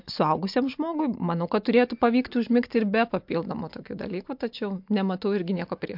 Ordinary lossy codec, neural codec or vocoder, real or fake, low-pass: MP3, 48 kbps; none; real; 5.4 kHz